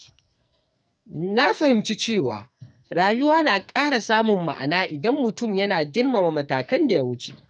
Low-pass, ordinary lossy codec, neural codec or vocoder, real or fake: 9.9 kHz; none; codec, 44.1 kHz, 2.6 kbps, SNAC; fake